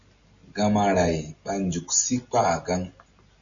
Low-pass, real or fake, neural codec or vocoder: 7.2 kHz; real; none